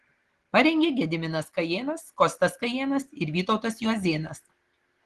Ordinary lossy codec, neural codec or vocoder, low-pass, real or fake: Opus, 16 kbps; none; 10.8 kHz; real